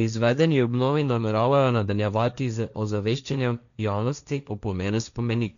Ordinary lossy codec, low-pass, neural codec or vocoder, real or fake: none; 7.2 kHz; codec, 16 kHz, 1.1 kbps, Voila-Tokenizer; fake